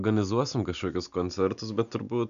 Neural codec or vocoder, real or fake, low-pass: none; real; 7.2 kHz